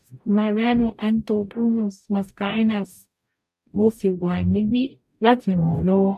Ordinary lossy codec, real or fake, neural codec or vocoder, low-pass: none; fake; codec, 44.1 kHz, 0.9 kbps, DAC; 14.4 kHz